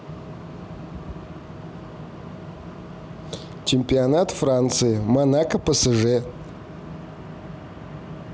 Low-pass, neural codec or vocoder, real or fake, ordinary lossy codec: none; none; real; none